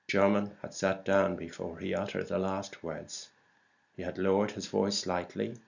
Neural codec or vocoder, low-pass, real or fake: none; 7.2 kHz; real